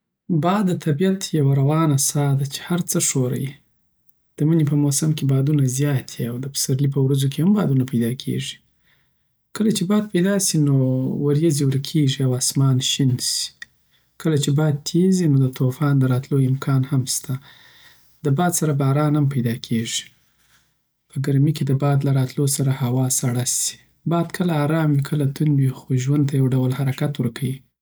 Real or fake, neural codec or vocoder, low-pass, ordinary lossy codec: real; none; none; none